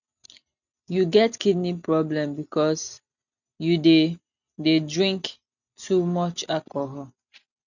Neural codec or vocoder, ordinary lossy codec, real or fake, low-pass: none; none; real; 7.2 kHz